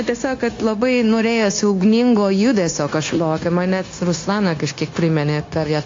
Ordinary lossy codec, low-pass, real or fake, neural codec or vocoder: AAC, 32 kbps; 7.2 kHz; fake; codec, 16 kHz, 0.9 kbps, LongCat-Audio-Codec